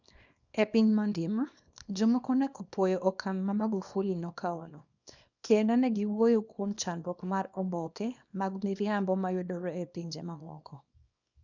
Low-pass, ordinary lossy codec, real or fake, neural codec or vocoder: 7.2 kHz; none; fake; codec, 24 kHz, 0.9 kbps, WavTokenizer, small release